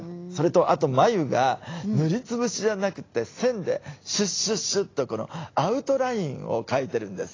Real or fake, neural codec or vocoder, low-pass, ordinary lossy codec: real; none; 7.2 kHz; AAC, 32 kbps